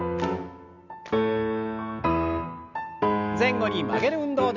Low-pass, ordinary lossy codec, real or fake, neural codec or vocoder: 7.2 kHz; none; real; none